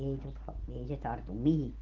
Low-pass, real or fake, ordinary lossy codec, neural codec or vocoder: 7.2 kHz; real; Opus, 16 kbps; none